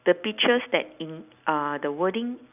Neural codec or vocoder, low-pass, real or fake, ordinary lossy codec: none; 3.6 kHz; real; none